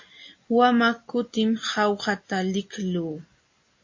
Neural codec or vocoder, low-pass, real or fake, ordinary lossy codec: none; 7.2 kHz; real; MP3, 32 kbps